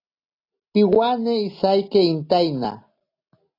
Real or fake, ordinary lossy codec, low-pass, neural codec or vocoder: real; AAC, 32 kbps; 5.4 kHz; none